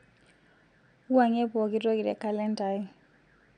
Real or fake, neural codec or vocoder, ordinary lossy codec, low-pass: real; none; none; 9.9 kHz